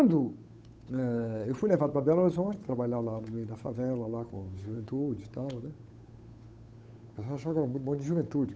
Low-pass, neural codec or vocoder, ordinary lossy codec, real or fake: none; codec, 16 kHz, 8 kbps, FunCodec, trained on Chinese and English, 25 frames a second; none; fake